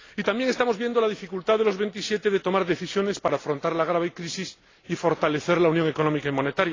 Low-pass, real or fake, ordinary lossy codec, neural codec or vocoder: 7.2 kHz; real; AAC, 32 kbps; none